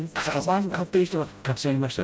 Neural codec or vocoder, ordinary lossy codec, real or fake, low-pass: codec, 16 kHz, 0.5 kbps, FreqCodec, smaller model; none; fake; none